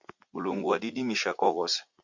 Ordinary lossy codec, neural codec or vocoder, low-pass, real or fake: Opus, 64 kbps; vocoder, 44.1 kHz, 80 mel bands, Vocos; 7.2 kHz; fake